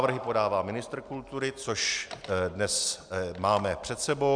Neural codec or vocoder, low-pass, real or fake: none; 9.9 kHz; real